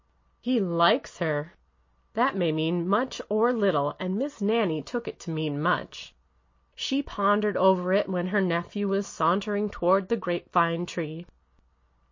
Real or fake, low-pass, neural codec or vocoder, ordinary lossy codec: real; 7.2 kHz; none; MP3, 32 kbps